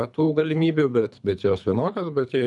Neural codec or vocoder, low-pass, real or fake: codec, 24 kHz, 3 kbps, HILCodec; 10.8 kHz; fake